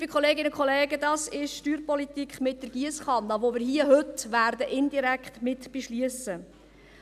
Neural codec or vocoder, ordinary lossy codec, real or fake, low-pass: none; none; real; 14.4 kHz